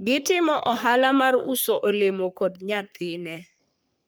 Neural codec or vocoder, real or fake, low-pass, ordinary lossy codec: codec, 44.1 kHz, 3.4 kbps, Pupu-Codec; fake; none; none